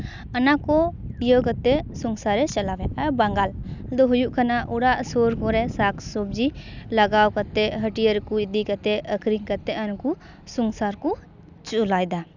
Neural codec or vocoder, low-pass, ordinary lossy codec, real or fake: none; 7.2 kHz; none; real